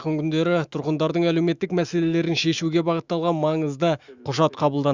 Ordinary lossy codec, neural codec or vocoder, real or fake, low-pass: Opus, 64 kbps; autoencoder, 48 kHz, 128 numbers a frame, DAC-VAE, trained on Japanese speech; fake; 7.2 kHz